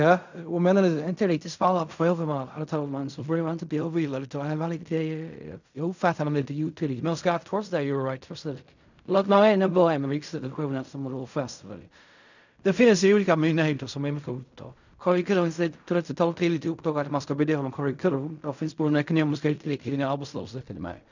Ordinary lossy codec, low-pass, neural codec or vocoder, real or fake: none; 7.2 kHz; codec, 16 kHz in and 24 kHz out, 0.4 kbps, LongCat-Audio-Codec, fine tuned four codebook decoder; fake